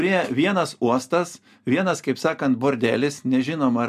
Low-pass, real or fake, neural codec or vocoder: 14.4 kHz; real; none